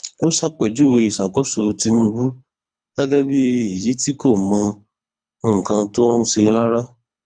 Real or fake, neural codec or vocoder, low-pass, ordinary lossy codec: fake; codec, 24 kHz, 3 kbps, HILCodec; 9.9 kHz; none